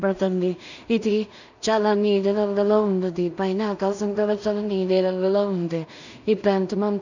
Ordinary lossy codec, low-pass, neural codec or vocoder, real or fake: none; 7.2 kHz; codec, 16 kHz in and 24 kHz out, 0.4 kbps, LongCat-Audio-Codec, two codebook decoder; fake